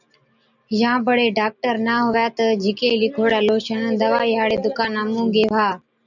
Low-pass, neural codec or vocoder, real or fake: 7.2 kHz; none; real